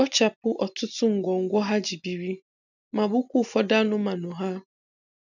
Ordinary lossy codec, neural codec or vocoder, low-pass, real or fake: none; none; 7.2 kHz; real